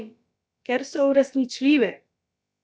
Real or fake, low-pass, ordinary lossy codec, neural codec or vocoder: fake; none; none; codec, 16 kHz, about 1 kbps, DyCAST, with the encoder's durations